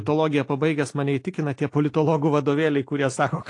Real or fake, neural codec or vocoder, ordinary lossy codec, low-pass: real; none; AAC, 48 kbps; 10.8 kHz